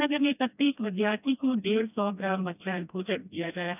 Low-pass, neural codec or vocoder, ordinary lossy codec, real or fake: 3.6 kHz; codec, 16 kHz, 1 kbps, FreqCodec, smaller model; none; fake